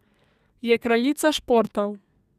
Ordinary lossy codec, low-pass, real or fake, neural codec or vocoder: none; 14.4 kHz; fake; codec, 32 kHz, 1.9 kbps, SNAC